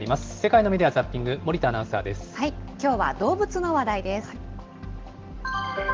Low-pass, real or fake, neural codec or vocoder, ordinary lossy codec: 7.2 kHz; real; none; Opus, 16 kbps